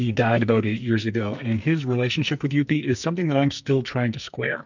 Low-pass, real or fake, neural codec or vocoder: 7.2 kHz; fake; codec, 44.1 kHz, 2.6 kbps, SNAC